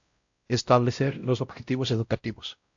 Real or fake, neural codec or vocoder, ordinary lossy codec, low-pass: fake; codec, 16 kHz, 0.5 kbps, X-Codec, WavLM features, trained on Multilingual LibriSpeech; AAC, 64 kbps; 7.2 kHz